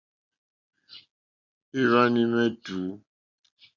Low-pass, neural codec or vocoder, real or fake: 7.2 kHz; none; real